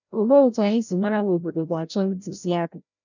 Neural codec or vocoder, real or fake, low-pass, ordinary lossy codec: codec, 16 kHz, 0.5 kbps, FreqCodec, larger model; fake; 7.2 kHz; none